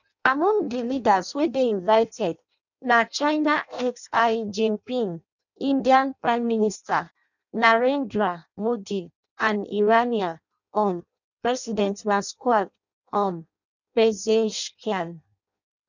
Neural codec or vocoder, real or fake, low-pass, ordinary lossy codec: codec, 16 kHz in and 24 kHz out, 0.6 kbps, FireRedTTS-2 codec; fake; 7.2 kHz; none